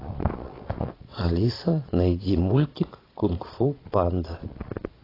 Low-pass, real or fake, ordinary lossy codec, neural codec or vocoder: 5.4 kHz; fake; AAC, 24 kbps; vocoder, 22.05 kHz, 80 mel bands, Vocos